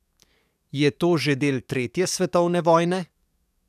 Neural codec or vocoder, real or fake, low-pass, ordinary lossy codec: codec, 44.1 kHz, 7.8 kbps, DAC; fake; 14.4 kHz; none